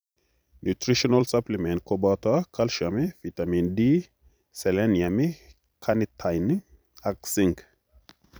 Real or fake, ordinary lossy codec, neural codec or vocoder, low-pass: fake; none; vocoder, 44.1 kHz, 128 mel bands every 256 samples, BigVGAN v2; none